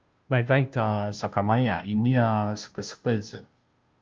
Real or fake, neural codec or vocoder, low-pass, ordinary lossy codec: fake; codec, 16 kHz, 0.5 kbps, FunCodec, trained on Chinese and English, 25 frames a second; 7.2 kHz; Opus, 24 kbps